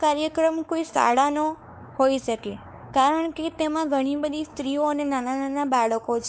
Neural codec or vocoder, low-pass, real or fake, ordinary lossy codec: codec, 16 kHz, 4 kbps, X-Codec, WavLM features, trained on Multilingual LibriSpeech; none; fake; none